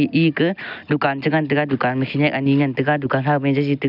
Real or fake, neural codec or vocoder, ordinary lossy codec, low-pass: real; none; none; 5.4 kHz